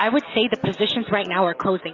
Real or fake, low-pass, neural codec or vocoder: real; 7.2 kHz; none